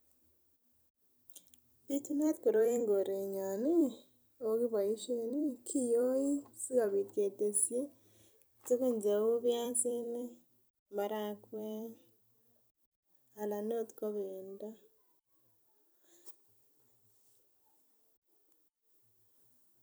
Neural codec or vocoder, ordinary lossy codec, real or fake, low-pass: vocoder, 44.1 kHz, 128 mel bands every 256 samples, BigVGAN v2; none; fake; none